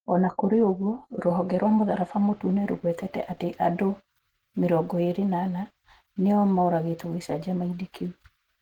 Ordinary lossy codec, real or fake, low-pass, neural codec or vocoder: Opus, 16 kbps; real; 19.8 kHz; none